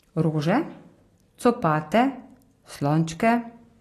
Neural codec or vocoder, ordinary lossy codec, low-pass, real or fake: none; AAC, 48 kbps; 14.4 kHz; real